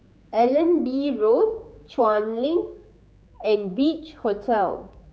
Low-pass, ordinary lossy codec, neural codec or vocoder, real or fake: none; none; codec, 16 kHz, 4 kbps, X-Codec, HuBERT features, trained on general audio; fake